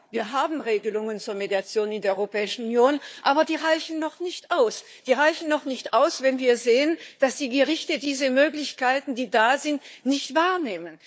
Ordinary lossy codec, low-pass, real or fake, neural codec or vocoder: none; none; fake; codec, 16 kHz, 4 kbps, FunCodec, trained on Chinese and English, 50 frames a second